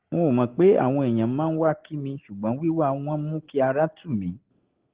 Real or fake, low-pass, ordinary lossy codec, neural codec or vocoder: real; 3.6 kHz; Opus, 16 kbps; none